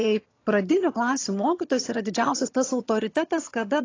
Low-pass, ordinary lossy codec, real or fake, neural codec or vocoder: 7.2 kHz; AAC, 32 kbps; fake; vocoder, 22.05 kHz, 80 mel bands, HiFi-GAN